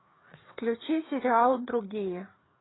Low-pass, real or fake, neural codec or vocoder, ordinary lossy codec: 7.2 kHz; fake; codec, 16 kHz, 4 kbps, FreqCodec, larger model; AAC, 16 kbps